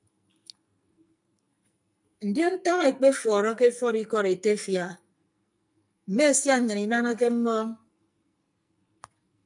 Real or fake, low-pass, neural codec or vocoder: fake; 10.8 kHz; codec, 44.1 kHz, 2.6 kbps, SNAC